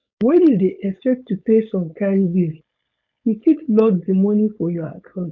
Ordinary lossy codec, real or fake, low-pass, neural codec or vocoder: none; fake; 7.2 kHz; codec, 16 kHz, 4.8 kbps, FACodec